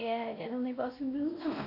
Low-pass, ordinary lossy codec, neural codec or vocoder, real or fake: 5.4 kHz; AAC, 48 kbps; codec, 16 kHz, 1 kbps, X-Codec, WavLM features, trained on Multilingual LibriSpeech; fake